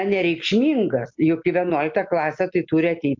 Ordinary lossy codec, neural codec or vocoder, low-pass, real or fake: MP3, 64 kbps; none; 7.2 kHz; real